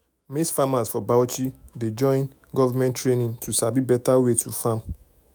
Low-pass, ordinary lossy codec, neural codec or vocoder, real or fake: none; none; autoencoder, 48 kHz, 128 numbers a frame, DAC-VAE, trained on Japanese speech; fake